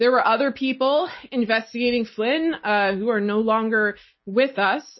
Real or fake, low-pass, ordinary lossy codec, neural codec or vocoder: real; 7.2 kHz; MP3, 24 kbps; none